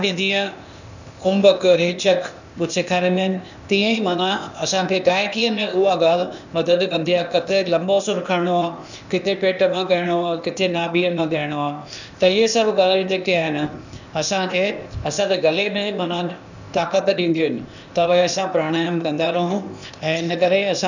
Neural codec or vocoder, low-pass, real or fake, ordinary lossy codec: codec, 16 kHz, 0.8 kbps, ZipCodec; 7.2 kHz; fake; none